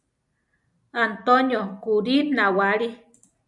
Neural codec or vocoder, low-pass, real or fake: none; 10.8 kHz; real